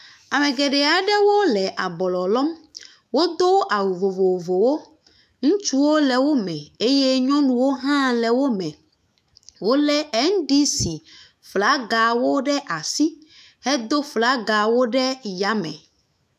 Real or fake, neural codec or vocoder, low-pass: fake; autoencoder, 48 kHz, 128 numbers a frame, DAC-VAE, trained on Japanese speech; 14.4 kHz